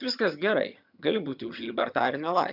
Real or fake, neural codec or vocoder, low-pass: fake; vocoder, 22.05 kHz, 80 mel bands, HiFi-GAN; 5.4 kHz